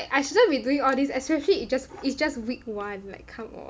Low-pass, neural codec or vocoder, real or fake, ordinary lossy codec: none; none; real; none